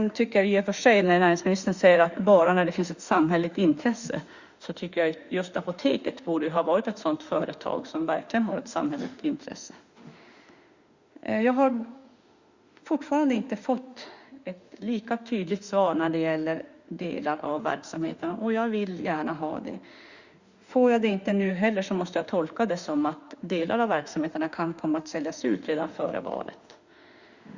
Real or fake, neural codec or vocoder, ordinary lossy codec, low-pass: fake; autoencoder, 48 kHz, 32 numbers a frame, DAC-VAE, trained on Japanese speech; Opus, 64 kbps; 7.2 kHz